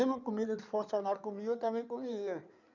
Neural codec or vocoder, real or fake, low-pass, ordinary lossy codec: codec, 16 kHz in and 24 kHz out, 2.2 kbps, FireRedTTS-2 codec; fake; 7.2 kHz; none